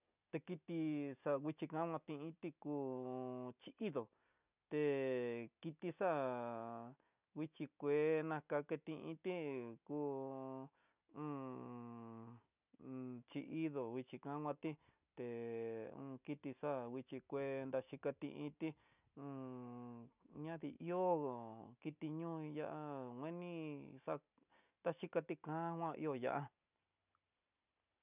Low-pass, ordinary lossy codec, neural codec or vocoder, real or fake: 3.6 kHz; none; none; real